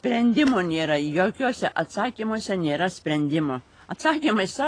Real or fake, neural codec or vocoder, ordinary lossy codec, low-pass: real; none; AAC, 32 kbps; 9.9 kHz